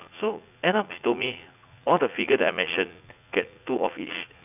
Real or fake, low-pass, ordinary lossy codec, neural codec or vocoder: fake; 3.6 kHz; none; vocoder, 44.1 kHz, 80 mel bands, Vocos